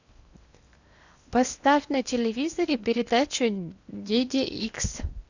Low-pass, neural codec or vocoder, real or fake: 7.2 kHz; codec, 16 kHz in and 24 kHz out, 0.8 kbps, FocalCodec, streaming, 65536 codes; fake